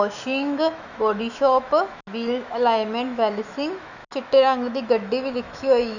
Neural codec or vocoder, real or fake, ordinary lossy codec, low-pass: autoencoder, 48 kHz, 128 numbers a frame, DAC-VAE, trained on Japanese speech; fake; none; 7.2 kHz